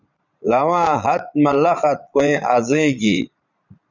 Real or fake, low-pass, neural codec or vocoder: fake; 7.2 kHz; vocoder, 22.05 kHz, 80 mel bands, Vocos